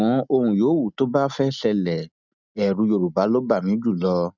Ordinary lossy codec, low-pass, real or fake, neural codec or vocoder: none; 7.2 kHz; real; none